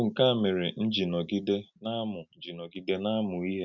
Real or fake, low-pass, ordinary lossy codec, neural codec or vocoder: real; 7.2 kHz; none; none